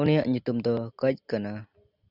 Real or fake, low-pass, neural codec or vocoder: real; 5.4 kHz; none